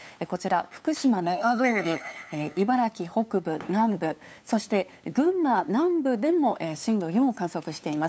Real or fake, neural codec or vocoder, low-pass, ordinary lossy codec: fake; codec, 16 kHz, 2 kbps, FunCodec, trained on LibriTTS, 25 frames a second; none; none